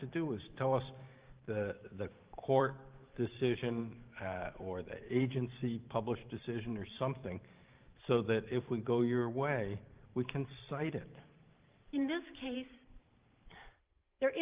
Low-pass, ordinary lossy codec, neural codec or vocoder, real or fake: 3.6 kHz; Opus, 32 kbps; vocoder, 44.1 kHz, 128 mel bands every 512 samples, BigVGAN v2; fake